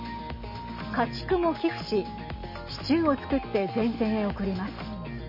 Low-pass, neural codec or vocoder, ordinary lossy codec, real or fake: 5.4 kHz; none; MP3, 24 kbps; real